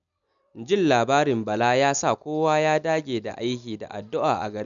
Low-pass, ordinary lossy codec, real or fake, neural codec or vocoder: 7.2 kHz; none; real; none